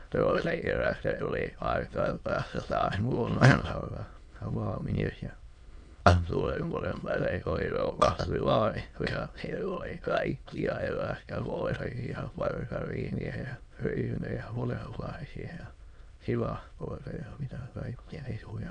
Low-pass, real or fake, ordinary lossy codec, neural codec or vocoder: 9.9 kHz; fake; none; autoencoder, 22.05 kHz, a latent of 192 numbers a frame, VITS, trained on many speakers